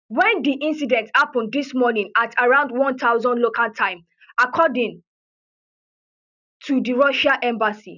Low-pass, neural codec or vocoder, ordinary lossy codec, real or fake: 7.2 kHz; none; none; real